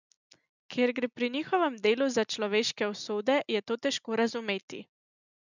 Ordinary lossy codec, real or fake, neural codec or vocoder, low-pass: none; real; none; 7.2 kHz